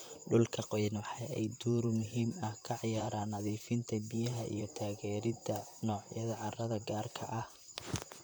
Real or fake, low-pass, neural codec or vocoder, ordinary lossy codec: fake; none; vocoder, 44.1 kHz, 128 mel bands, Pupu-Vocoder; none